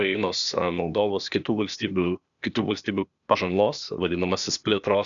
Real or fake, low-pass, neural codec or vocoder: fake; 7.2 kHz; codec, 16 kHz, 0.8 kbps, ZipCodec